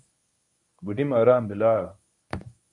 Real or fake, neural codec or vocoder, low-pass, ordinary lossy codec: fake; codec, 24 kHz, 0.9 kbps, WavTokenizer, medium speech release version 1; 10.8 kHz; MP3, 96 kbps